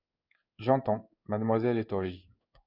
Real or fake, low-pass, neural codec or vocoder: fake; 5.4 kHz; codec, 16 kHz in and 24 kHz out, 1 kbps, XY-Tokenizer